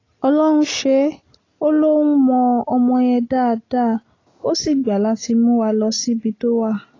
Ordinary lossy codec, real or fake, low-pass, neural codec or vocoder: AAC, 32 kbps; real; 7.2 kHz; none